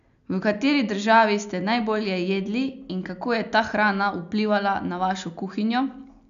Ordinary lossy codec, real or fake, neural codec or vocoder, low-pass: none; real; none; 7.2 kHz